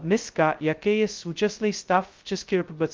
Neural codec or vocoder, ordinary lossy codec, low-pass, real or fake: codec, 16 kHz, 0.2 kbps, FocalCodec; Opus, 32 kbps; 7.2 kHz; fake